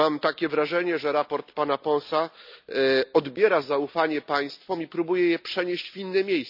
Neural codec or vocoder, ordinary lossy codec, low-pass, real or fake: none; none; 5.4 kHz; real